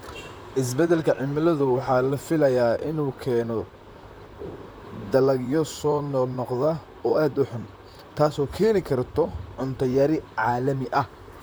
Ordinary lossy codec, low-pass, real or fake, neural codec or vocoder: none; none; fake; vocoder, 44.1 kHz, 128 mel bands, Pupu-Vocoder